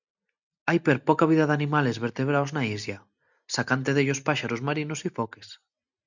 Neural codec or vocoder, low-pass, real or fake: none; 7.2 kHz; real